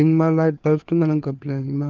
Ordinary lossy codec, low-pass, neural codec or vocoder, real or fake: Opus, 24 kbps; 7.2 kHz; codec, 16 kHz, 4 kbps, FreqCodec, larger model; fake